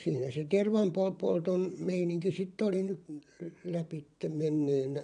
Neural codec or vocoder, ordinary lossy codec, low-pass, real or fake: vocoder, 22.05 kHz, 80 mel bands, WaveNeXt; AAC, 96 kbps; 9.9 kHz; fake